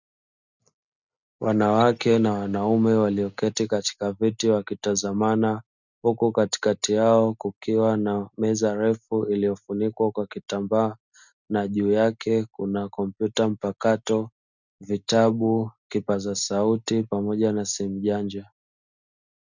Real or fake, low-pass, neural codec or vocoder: real; 7.2 kHz; none